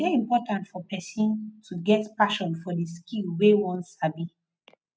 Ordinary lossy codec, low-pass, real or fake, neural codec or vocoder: none; none; real; none